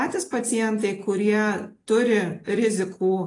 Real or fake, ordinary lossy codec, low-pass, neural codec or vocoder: real; AAC, 32 kbps; 10.8 kHz; none